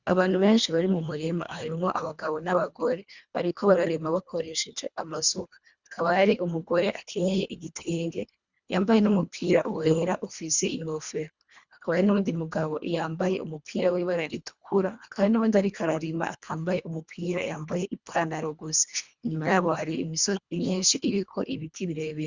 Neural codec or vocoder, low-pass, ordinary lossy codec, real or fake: codec, 24 kHz, 1.5 kbps, HILCodec; 7.2 kHz; Opus, 64 kbps; fake